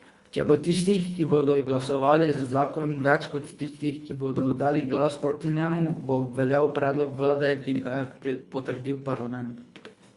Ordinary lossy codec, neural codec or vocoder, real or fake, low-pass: Opus, 64 kbps; codec, 24 kHz, 1.5 kbps, HILCodec; fake; 10.8 kHz